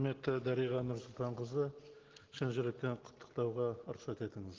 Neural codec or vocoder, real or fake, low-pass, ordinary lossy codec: none; real; 7.2 kHz; Opus, 16 kbps